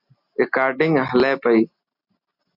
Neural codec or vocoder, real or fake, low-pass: none; real; 5.4 kHz